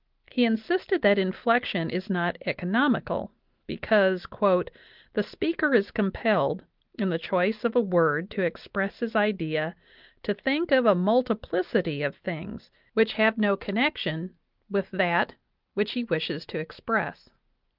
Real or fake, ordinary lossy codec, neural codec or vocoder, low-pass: real; Opus, 32 kbps; none; 5.4 kHz